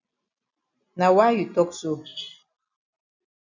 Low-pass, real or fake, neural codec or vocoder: 7.2 kHz; real; none